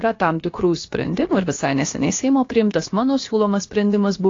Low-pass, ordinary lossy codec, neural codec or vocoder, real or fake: 7.2 kHz; AAC, 32 kbps; codec, 16 kHz, about 1 kbps, DyCAST, with the encoder's durations; fake